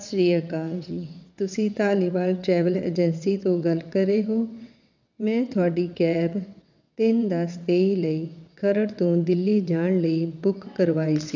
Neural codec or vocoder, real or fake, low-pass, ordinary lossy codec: vocoder, 22.05 kHz, 80 mel bands, WaveNeXt; fake; 7.2 kHz; none